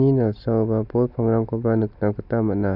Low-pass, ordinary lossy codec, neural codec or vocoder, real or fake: 5.4 kHz; none; none; real